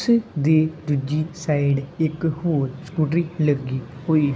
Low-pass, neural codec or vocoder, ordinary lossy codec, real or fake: none; none; none; real